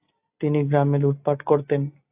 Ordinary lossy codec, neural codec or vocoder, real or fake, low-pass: AAC, 32 kbps; none; real; 3.6 kHz